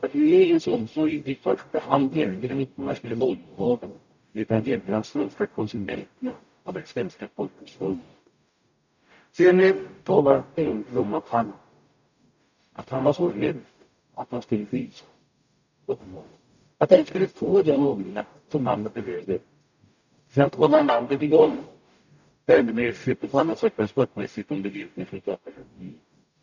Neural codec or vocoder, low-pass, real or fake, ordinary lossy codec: codec, 44.1 kHz, 0.9 kbps, DAC; 7.2 kHz; fake; none